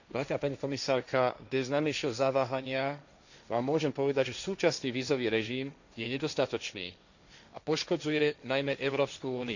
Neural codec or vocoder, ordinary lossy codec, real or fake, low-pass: codec, 16 kHz, 1.1 kbps, Voila-Tokenizer; none; fake; 7.2 kHz